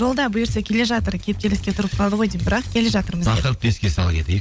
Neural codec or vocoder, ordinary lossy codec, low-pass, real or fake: codec, 16 kHz, 16 kbps, FunCodec, trained on LibriTTS, 50 frames a second; none; none; fake